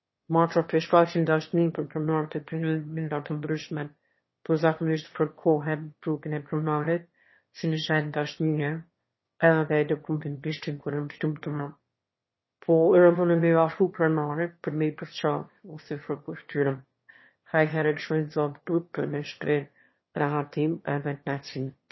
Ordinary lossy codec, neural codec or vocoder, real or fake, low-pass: MP3, 24 kbps; autoencoder, 22.05 kHz, a latent of 192 numbers a frame, VITS, trained on one speaker; fake; 7.2 kHz